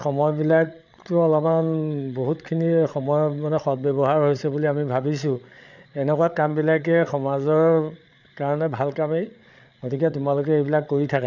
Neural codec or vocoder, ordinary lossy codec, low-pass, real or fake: codec, 16 kHz, 8 kbps, FreqCodec, larger model; none; 7.2 kHz; fake